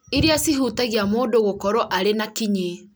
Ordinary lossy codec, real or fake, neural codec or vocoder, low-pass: none; real; none; none